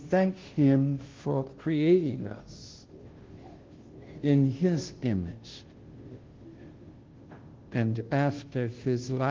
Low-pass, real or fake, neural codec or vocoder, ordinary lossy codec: 7.2 kHz; fake; codec, 16 kHz, 0.5 kbps, FunCodec, trained on Chinese and English, 25 frames a second; Opus, 16 kbps